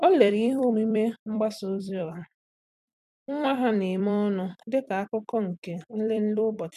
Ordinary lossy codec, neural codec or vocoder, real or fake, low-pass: none; vocoder, 44.1 kHz, 128 mel bands every 256 samples, BigVGAN v2; fake; 14.4 kHz